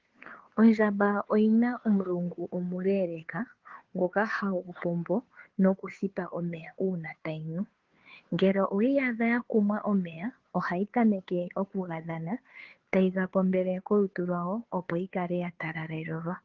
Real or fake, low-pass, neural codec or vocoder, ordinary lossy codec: fake; 7.2 kHz; codec, 16 kHz, 2 kbps, FunCodec, trained on Chinese and English, 25 frames a second; Opus, 16 kbps